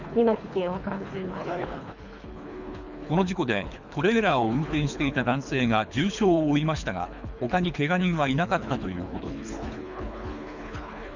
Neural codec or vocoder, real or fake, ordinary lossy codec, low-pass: codec, 24 kHz, 3 kbps, HILCodec; fake; none; 7.2 kHz